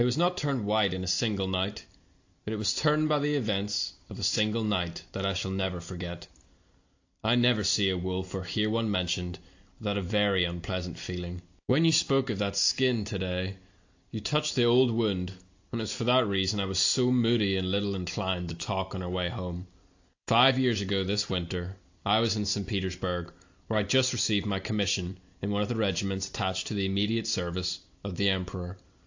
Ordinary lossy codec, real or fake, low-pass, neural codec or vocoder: AAC, 48 kbps; real; 7.2 kHz; none